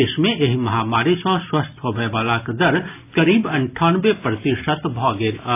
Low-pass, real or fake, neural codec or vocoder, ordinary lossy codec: 3.6 kHz; real; none; AAC, 24 kbps